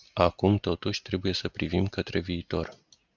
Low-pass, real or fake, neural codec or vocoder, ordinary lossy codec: 7.2 kHz; real; none; Opus, 64 kbps